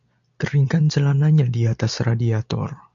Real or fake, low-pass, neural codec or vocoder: real; 7.2 kHz; none